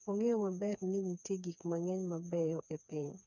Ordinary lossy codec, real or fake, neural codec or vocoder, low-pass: none; fake; codec, 16 kHz, 4 kbps, FreqCodec, smaller model; 7.2 kHz